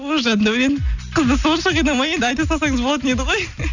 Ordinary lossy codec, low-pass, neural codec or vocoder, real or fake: none; 7.2 kHz; none; real